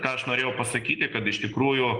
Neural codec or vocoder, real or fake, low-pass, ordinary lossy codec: none; real; 9.9 kHz; Opus, 24 kbps